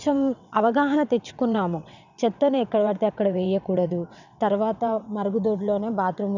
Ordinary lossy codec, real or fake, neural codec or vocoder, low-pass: none; fake; vocoder, 22.05 kHz, 80 mel bands, WaveNeXt; 7.2 kHz